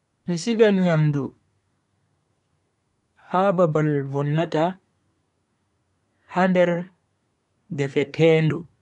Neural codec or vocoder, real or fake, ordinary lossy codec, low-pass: codec, 24 kHz, 1 kbps, SNAC; fake; none; 10.8 kHz